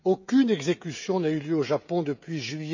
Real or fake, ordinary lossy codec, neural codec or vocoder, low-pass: fake; none; codec, 16 kHz, 16 kbps, FreqCodec, smaller model; 7.2 kHz